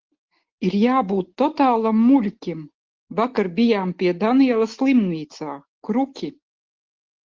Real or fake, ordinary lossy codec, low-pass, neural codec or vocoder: real; Opus, 16 kbps; 7.2 kHz; none